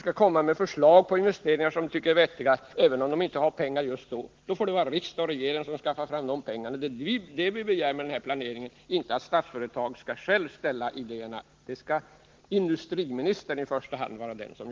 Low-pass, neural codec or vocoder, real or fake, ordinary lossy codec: 7.2 kHz; none; real; Opus, 16 kbps